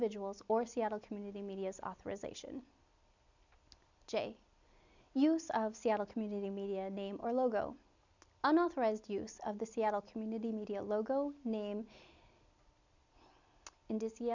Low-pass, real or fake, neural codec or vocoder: 7.2 kHz; real; none